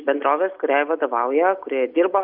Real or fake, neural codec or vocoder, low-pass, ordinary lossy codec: real; none; 5.4 kHz; Opus, 64 kbps